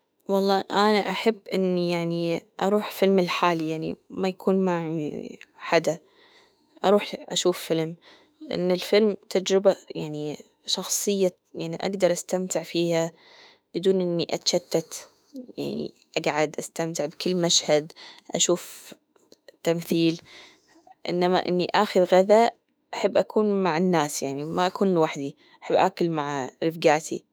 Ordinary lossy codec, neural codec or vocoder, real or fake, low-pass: none; autoencoder, 48 kHz, 32 numbers a frame, DAC-VAE, trained on Japanese speech; fake; none